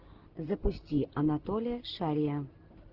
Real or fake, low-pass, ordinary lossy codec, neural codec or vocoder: real; 5.4 kHz; Opus, 16 kbps; none